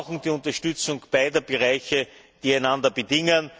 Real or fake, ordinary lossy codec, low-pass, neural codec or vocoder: real; none; none; none